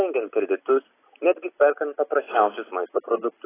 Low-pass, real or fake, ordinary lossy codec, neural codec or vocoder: 3.6 kHz; real; AAC, 16 kbps; none